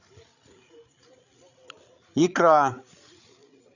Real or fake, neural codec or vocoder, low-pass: fake; codec, 16 kHz, 8 kbps, FreqCodec, larger model; 7.2 kHz